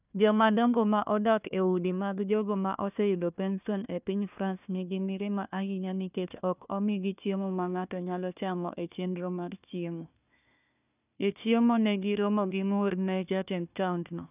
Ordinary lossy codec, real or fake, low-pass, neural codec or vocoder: none; fake; 3.6 kHz; codec, 16 kHz, 1 kbps, FunCodec, trained on Chinese and English, 50 frames a second